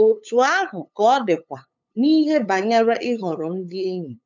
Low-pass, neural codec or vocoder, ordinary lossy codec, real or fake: 7.2 kHz; codec, 16 kHz, 8 kbps, FunCodec, trained on LibriTTS, 25 frames a second; none; fake